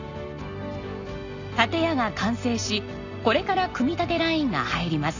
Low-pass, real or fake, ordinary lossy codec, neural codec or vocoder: 7.2 kHz; real; none; none